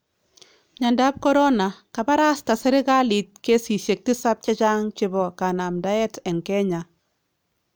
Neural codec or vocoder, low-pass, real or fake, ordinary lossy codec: none; none; real; none